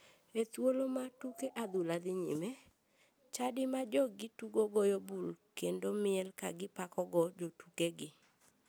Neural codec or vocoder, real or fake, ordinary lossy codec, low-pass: none; real; none; none